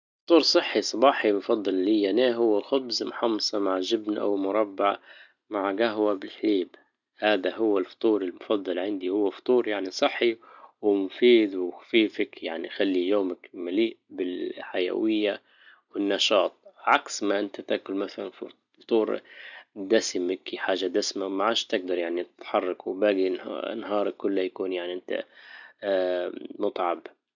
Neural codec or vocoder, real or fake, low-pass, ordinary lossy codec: none; real; 7.2 kHz; none